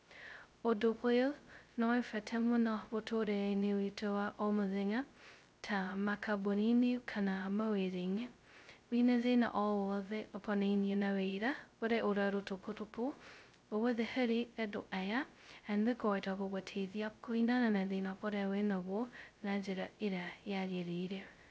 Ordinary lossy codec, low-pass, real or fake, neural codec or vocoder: none; none; fake; codec, 16 kHz, 0.2 kbps, FocalCodec